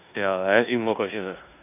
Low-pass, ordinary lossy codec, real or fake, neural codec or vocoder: 3.6 kHz; none; fake; codec, 16 kHz in and 24 kHz out, 0.9 kbps, LongCat-Audio-Codec, four codebook decoder